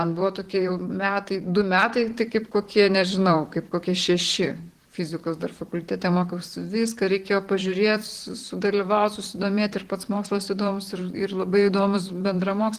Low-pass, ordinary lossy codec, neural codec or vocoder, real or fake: 14.4 kHz; Opus, 16 kbps; vocoder, 44.1 kHz, 128 mel bands, Pupu-Vocoder; fake